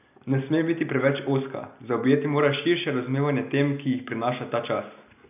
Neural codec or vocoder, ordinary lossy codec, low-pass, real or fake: none; none; 3.6 kHz; real